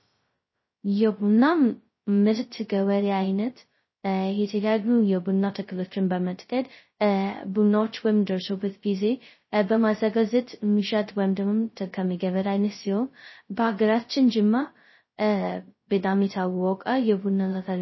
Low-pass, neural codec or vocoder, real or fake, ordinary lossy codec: 7.2 kHz; codec, 16 kHz, 0.2 kbps, FocalCodec; fake; MP3, 24 kbps